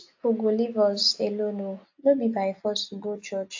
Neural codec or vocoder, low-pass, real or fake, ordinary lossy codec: none; 7.2 kHz; real; AAC, 48 kbps